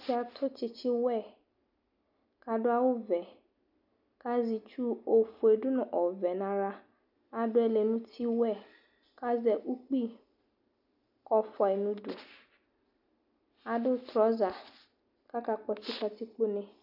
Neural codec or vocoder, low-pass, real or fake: none; 5.4 kHz; real